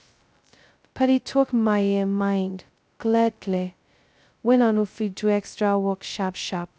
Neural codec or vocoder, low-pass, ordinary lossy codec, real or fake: codec, 16 kHz, 0.2 kbps, FocalCodec; none; none; fake